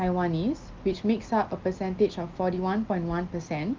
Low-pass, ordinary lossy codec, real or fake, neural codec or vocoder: 7.2 kHz; Opus, 32 kbps; real; none